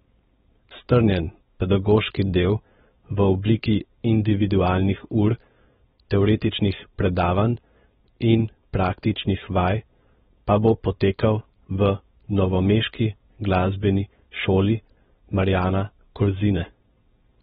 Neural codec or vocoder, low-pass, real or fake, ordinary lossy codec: codec, 16 kHz, 4.8 kbps, FACodec; 7.2 kHz; fake; AAC, 16 kbps